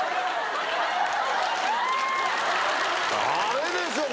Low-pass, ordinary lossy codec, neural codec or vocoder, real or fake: none; none; none; real